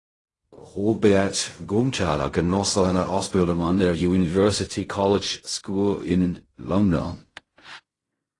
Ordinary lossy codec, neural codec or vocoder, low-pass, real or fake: AAC, 32 kbps; codec, 16 kHz in and 24 kHz out, 0.4 kbps, LongCat-Audio-Codec, fine tuned four codebook decoder; 10.8 kHz; fake